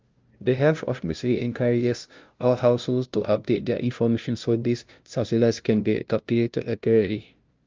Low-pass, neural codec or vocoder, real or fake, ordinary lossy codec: 7.2 kHz; codec, 16 kHz, 0.5 kbps, FunCodec, trained on LibriTTS, 25 frames a second; fake; Opus, 24 kbps